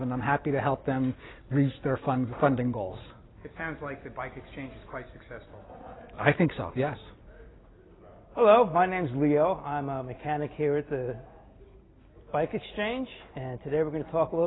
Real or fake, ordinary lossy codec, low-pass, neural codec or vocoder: real; AAC, 16 kbps; 7.2 kHz; none